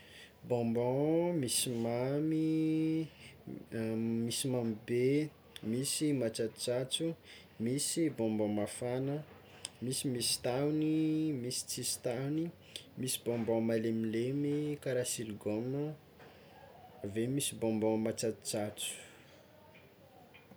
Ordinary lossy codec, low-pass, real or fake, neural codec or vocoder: none; none; real; none